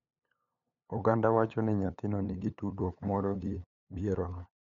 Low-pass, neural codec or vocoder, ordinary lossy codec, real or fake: 7.2 kHz; codec, 16 kHz, 8 kbps, FunCodec, trained on LibriTTS, 25 frames a second; none; fake